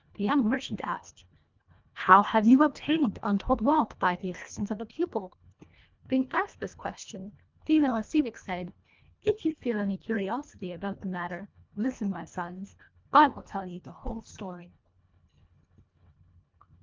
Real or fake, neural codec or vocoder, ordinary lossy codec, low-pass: fake; codec, 24 kHz, 1.5 kbps, HILCodec; Opus, 24 kbps; 7.2 kHz